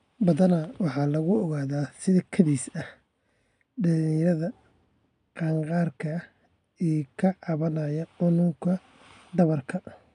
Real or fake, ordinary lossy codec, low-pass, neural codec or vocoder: real; none; 9.9 kHz; none